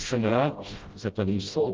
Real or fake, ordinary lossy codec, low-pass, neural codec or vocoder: fake; Opus, 32 kbps; 7.2 kHz; codec, 16 kHz, 0.5 kbps, FreqCodec, smaller model